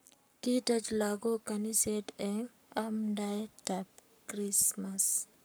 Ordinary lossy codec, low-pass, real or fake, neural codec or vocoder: none; none; fake; codec, 44.1 kHz, 7.8 kbps, Pupu-Codec